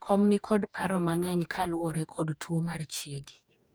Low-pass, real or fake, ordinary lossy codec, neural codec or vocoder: none; fake; none; codec, 44.1 kHz, 2.6 kbps, DAC